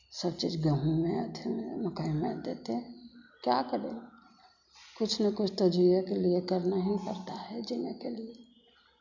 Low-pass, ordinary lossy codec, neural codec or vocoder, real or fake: 7.2 kHz; none; none; real